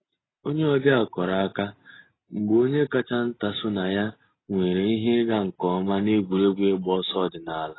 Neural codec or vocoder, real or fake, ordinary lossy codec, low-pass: none; real; AAC, 16 kbps; 7.2 kHz